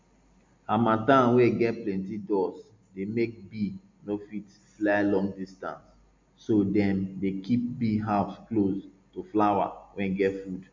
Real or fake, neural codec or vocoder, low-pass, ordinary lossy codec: real; none; 7.2 kHz; MP3, 64 kbps